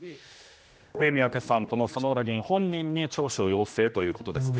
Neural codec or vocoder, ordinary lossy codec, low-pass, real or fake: codec, 16 kHz, 1 kbps, X-Codec, HuBERT features, trained on general audio; none; none; fake